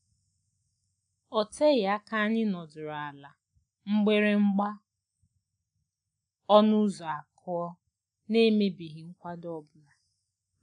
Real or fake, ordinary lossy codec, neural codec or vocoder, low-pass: real; none; none; 9.9 kHz